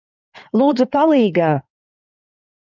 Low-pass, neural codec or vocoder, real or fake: 7.2 kHz; codec, 16 kHz in and 24 kHz out, 2.2 kbps, FireRedTTS-2 codec; fake